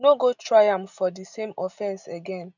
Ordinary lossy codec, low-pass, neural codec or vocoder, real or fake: none; 7.2 kHz; none; real